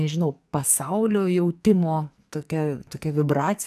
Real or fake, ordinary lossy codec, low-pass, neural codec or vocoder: fake; AAC, 96 kbps; 14.4 kHz; codec, 44.1 kHz, 2.6 kbps, SNAC